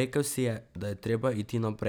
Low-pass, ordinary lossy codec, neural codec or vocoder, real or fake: none; none; none; real